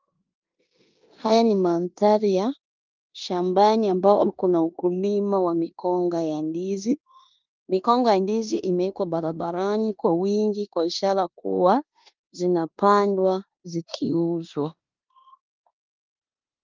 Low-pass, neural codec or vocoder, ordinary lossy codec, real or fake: 7.2 kHz; codec, 16 kHz in and 24 kHz out, 0.9 kbps, LongCat-Audio-Codec, four codebook decoder; Opus, 24 kbps; fake